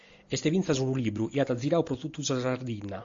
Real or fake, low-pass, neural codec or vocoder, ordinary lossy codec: real; 7.2 kHz; none; AAC, 48 kbps